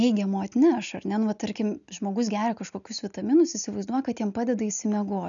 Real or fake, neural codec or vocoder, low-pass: real; none; 7.2 kHz